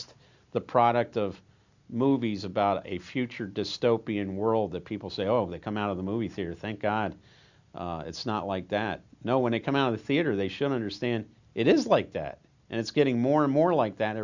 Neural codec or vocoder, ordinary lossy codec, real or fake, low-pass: none; Opus, 64 kbps; real; 7.2 kHz